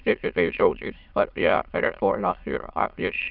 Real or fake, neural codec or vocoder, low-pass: fake; autoencoder, 22.05 kHz, a latent of 192 numbers a frame, VITS, trained on many speakers; 5.4 kHz